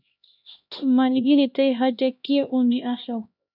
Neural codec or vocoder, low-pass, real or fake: codec, 16 kHz, 1 kbps, X-Codec, HuBERT features, trained on LibriSpeech; 5.4 kHz; fake